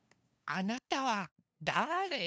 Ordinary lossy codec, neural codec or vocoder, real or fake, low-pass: none; codec, 16 kHz, 2 kbps, FunCodec, trained on LibriTTS, 25 frames a second; fake; none